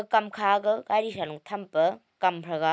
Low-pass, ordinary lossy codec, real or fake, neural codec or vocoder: none; none; real; none